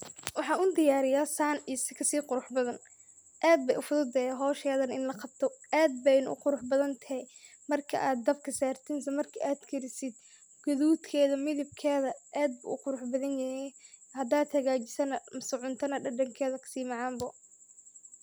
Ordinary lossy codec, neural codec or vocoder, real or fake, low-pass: none; none; real; none